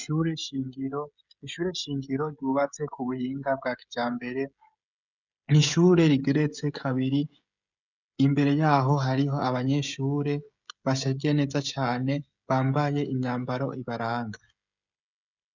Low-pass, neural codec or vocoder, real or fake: 7.2 kHz; codec, 16 kHz, 16 kbps, FreqCodec, smaller model; fake